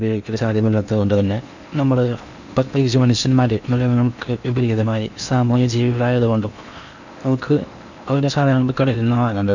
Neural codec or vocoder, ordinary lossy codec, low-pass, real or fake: codec, 16 kHz in and 24 kHz out, 0.8 kbps, FocalCodec, streaming, 65536 codes; none; 7.2 kHz; fake